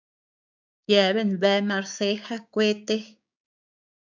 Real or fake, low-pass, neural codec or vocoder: fake; 7.2 kHz; codec, 16 kHz, 6 kbps, DAC